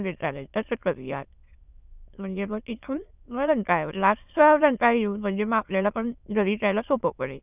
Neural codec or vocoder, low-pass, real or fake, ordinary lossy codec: autoencoder, 22.05 kHz, a latent of 192 numbers a frame, VITS, trained on many speakers; 3.6 kHz; fake; none